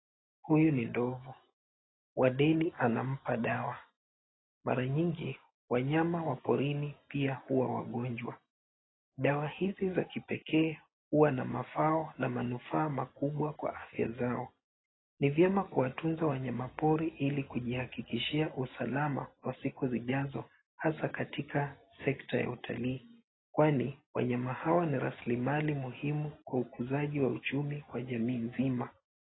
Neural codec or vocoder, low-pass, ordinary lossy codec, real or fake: none; 7.2 kHz; AAC, 16 kbps; real